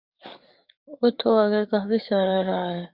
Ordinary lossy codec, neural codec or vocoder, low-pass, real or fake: Opus, 64 kbps; codec, 44.1 kHz, 7.8 kbps, DAC; 5.4 kHz; fake